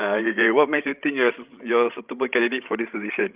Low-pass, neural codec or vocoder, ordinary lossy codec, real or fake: 3.6 kHz; codec, 16 kHz, 16 kbps, FreqCodec, larger model; Opus, 24 kbps; fake